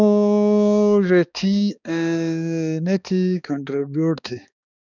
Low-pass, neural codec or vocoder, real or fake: 7.2 kHz; codec, 16 kHz, 2 kbps, X-Codec, HuBERT features, trained on balanced general audio; fake